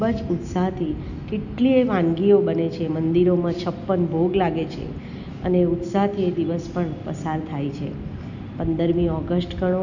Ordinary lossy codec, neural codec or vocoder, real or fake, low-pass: none; none; real; 7.2 kHz